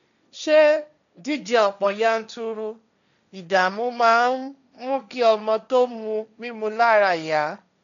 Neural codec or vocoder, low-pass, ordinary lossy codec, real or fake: codec, 16 kHz, 1.1 kbps, Voila-Tokenizer; 7.2 kHz; none; fake